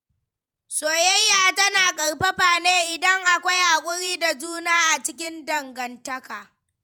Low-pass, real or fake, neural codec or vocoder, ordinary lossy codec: none; fake; vocoder, 48 kHz, 128 mel bands, Vocos; none